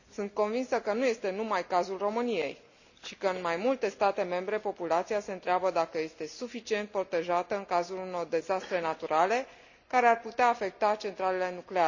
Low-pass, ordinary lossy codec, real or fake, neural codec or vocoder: 7.2 kHz; none; real; none